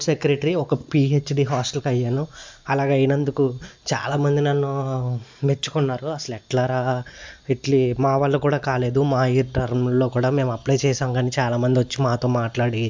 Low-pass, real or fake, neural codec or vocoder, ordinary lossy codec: 7.2 kHz; real; none; MP3, 64 kbps